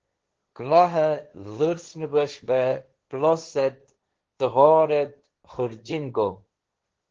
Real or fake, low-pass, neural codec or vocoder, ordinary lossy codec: fake; 7.2 kHz; codec, 16 kHz, 1.1 kbps, Voila-Tokenizer; Opus, 16 kbps